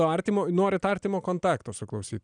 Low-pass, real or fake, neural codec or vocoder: 9.9 kHz; real; none